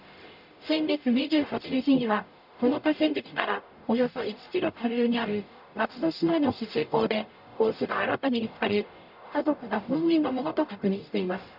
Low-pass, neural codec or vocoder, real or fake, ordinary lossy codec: 5.4 kHz; codec, 44.1 kHz, 0.9 kbps, DAC; fake; none